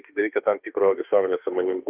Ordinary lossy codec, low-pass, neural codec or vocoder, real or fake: Opus, 32 kbps; 3.6 kHz; autoencoder, 48 kHz, 32 numbers a frame, DAC-VAE, trained on Japanese speech; fake